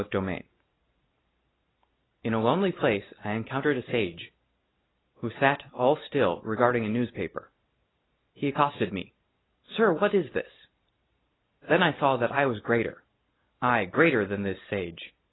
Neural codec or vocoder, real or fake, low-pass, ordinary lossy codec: none; real; 7.2 kHz; AAC, 16 kbps